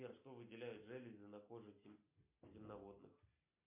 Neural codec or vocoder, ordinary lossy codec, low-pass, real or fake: none; MP3, 32 kbps; 3.6 kHz; real